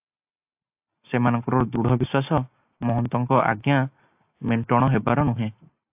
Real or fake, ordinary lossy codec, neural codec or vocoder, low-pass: real; AAC, 32 kbps; none; 3.6 kHz